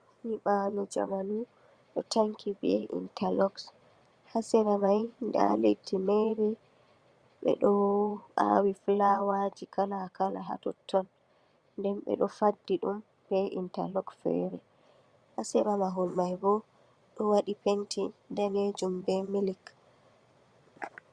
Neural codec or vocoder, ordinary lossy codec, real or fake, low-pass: vocoder, 22.05 kHz, 80 mel bands, Vocos; Opus, 64 kbps; fake; 9.9 kHz